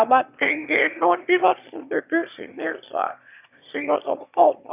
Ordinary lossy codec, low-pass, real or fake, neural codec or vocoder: none; 3.6 kHz; fake; autoencoder, 22.05 kHz, a latent of 192 numbers a frame, VITS, trained on one speaker